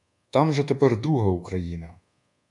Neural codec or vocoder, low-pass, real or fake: codec, 24 kHz, 1.2 kbps, DualCodec; 10.8 kHz; fake